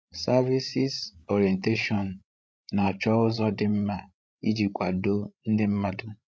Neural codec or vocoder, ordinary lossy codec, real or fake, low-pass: codec, 16 kHz, 16 kbps, FreqCodec, larger model; none; fake; none